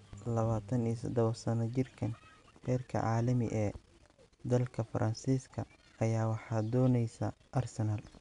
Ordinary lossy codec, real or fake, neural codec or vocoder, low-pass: none; real; none; 10.8 kHz